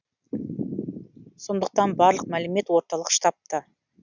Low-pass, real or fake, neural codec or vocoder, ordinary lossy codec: 7.2 kHz; real; none; none